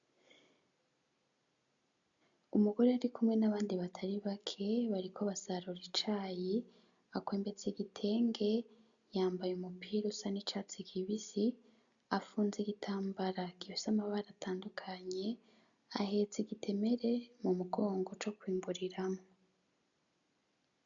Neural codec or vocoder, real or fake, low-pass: none; real; 7.2 kHz